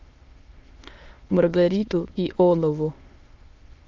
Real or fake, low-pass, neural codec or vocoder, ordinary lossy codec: fake; 7.2 kHz; autoencoder, 22.05 kHz, a latent of 192 numbers a frame, VITS, trained on many speakers; Opus, 32 kbps